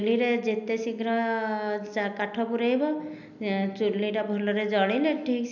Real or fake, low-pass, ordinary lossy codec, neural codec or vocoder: real; 7.2 kHz; none; none